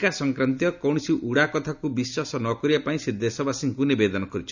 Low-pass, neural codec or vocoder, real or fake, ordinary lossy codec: 7.2 kHz; none; real; none